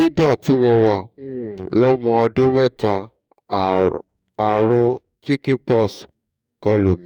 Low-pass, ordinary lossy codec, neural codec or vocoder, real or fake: 19.8 kHz; none; codec, 44.1 kHz, 2.6 kbps, DAC; fake